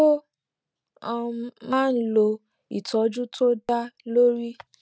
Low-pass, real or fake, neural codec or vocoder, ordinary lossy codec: none; real; none; none